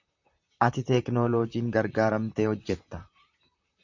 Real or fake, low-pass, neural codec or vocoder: fake; 7.2 kHz; vocoder, 44.1 kHz, 128 mel bands, Pupu-Vocoder